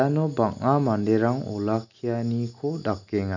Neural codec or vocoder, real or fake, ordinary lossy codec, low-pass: none; real; none; 7.2 kHz